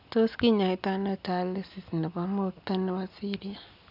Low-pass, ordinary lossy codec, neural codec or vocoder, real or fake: 5.4 kHz; none; none; real